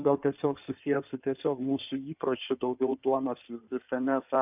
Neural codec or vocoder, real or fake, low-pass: codec, 16 kHz in and 24 kHz out, 2.2 kbps, FireRedTTS-2 codec; fake; 3.6 kHz